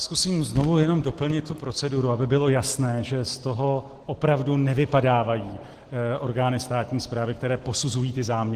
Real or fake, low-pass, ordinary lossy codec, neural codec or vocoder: real; 14.4 kHz; Opus, 16 kbps; none